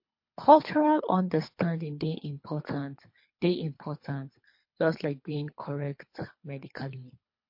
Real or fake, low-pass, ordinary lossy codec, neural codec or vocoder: fake; 5.4 kHz; MP3, 32 kbps; codec, 24 kHz, 3 kbps, HILCodec